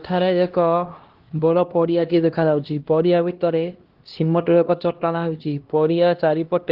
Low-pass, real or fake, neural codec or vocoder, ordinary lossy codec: 5.4 kHz; fake; codec, 16 kHz, 1 kbps, X-Codec, HuBERT features, trained on LibriSpeech; Opus, 16 kbps